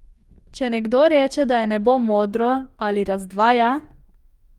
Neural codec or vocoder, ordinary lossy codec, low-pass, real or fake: codec, 44.1 kHz, 2.6 kbps, DAC; Opus, 32 kbps; 19.8 kHz; fake